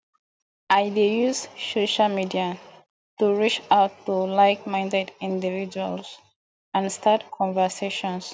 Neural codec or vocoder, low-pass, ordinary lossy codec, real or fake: none; none; none; real